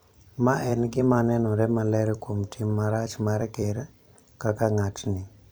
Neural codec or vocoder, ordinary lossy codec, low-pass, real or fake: vocoder, 44.1 kHz, 128 mel bands every 256 samples, BigVGAN v2; none; none; fake